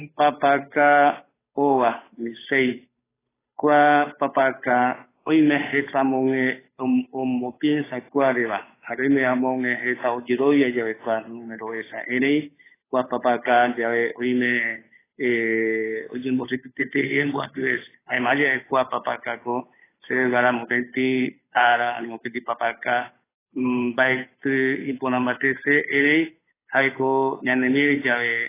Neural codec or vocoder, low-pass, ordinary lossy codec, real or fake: codec, 16 kHz, 8 kbps, FunCodec, trained on Chinese and English, 25 frames a second; 3.6 kHz; AAC, 16 kbps; fake